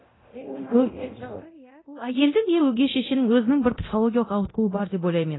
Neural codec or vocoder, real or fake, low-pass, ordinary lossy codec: codec, 24 kHz, 0.9 kbps, DualCodec; fake; 7.2 kHz; AAC, 16 kbps